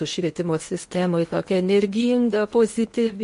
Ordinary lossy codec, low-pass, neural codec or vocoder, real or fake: MP3, 48 kbps; 10.8 kHz; codec, 16 kHz in and 24 kHz out, 0.8 kbps, FocalCodec, streaming, 65536 codes; fake